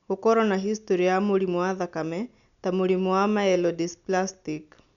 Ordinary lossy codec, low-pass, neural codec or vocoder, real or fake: none; 7.2 kHz; none; real